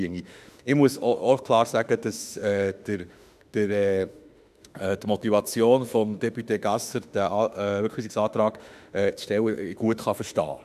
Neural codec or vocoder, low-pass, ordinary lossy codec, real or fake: autoencoder, 48 kHz, 32 numbers a frame, DAC-VAE, trained on Japanese speech; 14.4 kHz; none; fake